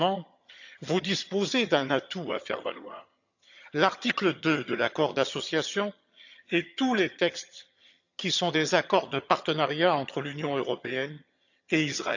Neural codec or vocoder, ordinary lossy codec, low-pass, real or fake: vocoder, 22.05 kHz, 80 mel bands, HiFi-GAN; none; 7.2 kHz; fake